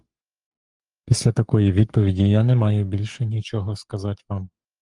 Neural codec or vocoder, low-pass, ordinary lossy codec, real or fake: codec, 44.1 kHz, 7.8 kbps, Pupu-Codec; 10.8 kHz; Opus, 32 kbps; fake